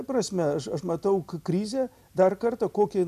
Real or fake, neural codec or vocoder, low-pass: real; none; 14.4 kHz